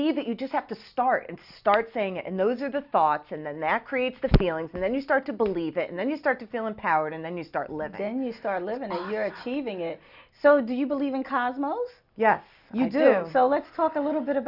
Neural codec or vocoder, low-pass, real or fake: none; 5.4 kHz; real